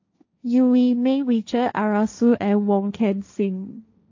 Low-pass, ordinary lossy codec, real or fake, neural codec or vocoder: 7.2 kHz; AAC, 48 kbps; fake; codec, 16 kHz, 1.1 kbps, Voila-Tokenizer